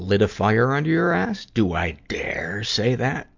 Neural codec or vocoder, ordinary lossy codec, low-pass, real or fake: none; MP3, 64 kbps; 7.2 kHz; real